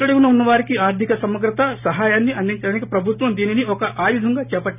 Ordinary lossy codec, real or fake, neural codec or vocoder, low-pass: none; real; none; 3.6 kHz